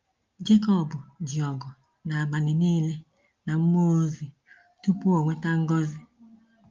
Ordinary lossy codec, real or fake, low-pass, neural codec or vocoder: Opus, 32 kbps; real; 7.2 kHz; none